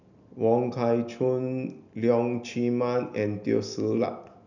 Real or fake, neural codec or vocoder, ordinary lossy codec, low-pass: real; none; none; 7.2 kHz